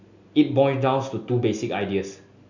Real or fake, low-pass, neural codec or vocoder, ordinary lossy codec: real; 7.2 kHz; none; none